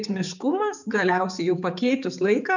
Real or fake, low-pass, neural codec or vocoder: fake; 7.2 kHz; codec, 16 kHz, 4 kbps, X-Codec, HuBERT features, trained on general audio